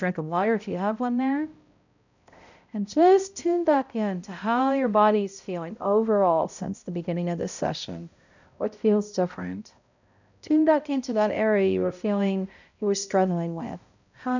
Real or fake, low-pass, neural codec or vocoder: fake; 7.2 kHz; codec, 16 kHz, 0.5 kbps, X-Codec, HuBERT features, trained on balanced general audio